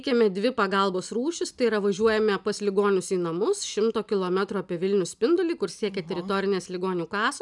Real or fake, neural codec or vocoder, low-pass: real; none; 10.8 kHz